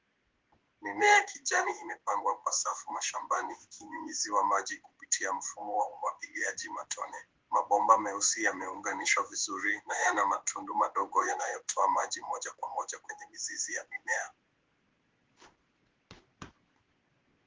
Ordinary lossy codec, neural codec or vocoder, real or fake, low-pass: Opus, 16 kbps; codec, 16 kHz in and 24 kHz out, 1 kbps, XY-Tokenizer; fake; 7.2 kHz